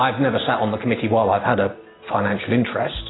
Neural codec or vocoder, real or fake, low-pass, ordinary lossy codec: none; real; 7.2 kHz; AAC, 16 kbps